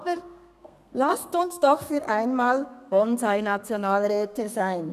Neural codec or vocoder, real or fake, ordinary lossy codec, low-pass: codec, 32 kHz, 1.9 kbps, SNAC; fake; none; 14.4 kHz